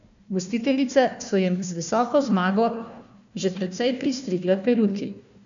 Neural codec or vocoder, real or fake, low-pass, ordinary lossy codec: codec, 16 kHz, 1 kbps, FunCodec, trained on Chinese and English, 50 frames a second; fake; 7.2 kHz; none